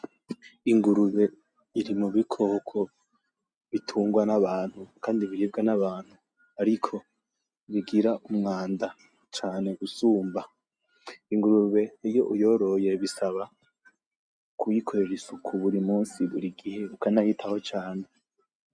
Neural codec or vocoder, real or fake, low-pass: none; real; 9.9 kHz